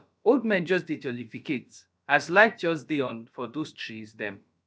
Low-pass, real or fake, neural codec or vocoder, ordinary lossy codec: none; fake; codec, 16 kHz, about 1 kbps, DyCAST, with the encoder's durations; none